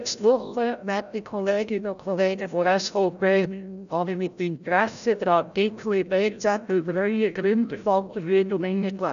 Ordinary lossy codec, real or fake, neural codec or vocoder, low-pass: none; fake; codec, 16 kHz, 0.5 kbps, FreqCodec, larger model; 7.2 kHz